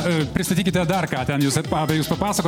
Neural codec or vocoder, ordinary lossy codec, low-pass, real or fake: none; MP3, 96 kbps; 19.8 kHz; real